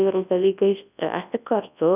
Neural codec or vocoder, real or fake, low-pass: codec, 24 kHz, 0.9 kbps, WavTokenizer, large speech release; fake; 3.6 kHz